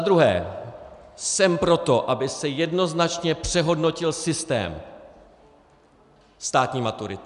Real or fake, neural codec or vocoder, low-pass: real; none; 10.8 kHz